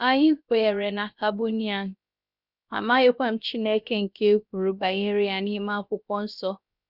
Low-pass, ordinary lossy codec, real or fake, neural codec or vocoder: 5.4 kHz; none; fake; codec, 16 kHz, about 1 kbps, DyCAST, with the encoder's durations